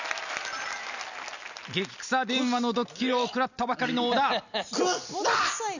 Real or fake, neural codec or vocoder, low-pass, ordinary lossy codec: real; none; 7.2 kHz; none